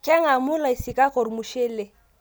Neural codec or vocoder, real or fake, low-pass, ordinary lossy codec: none; real; none; none